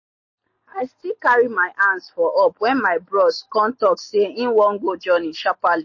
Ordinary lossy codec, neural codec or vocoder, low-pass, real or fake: MP3, 32 kbps; none; 7.2 kHz; real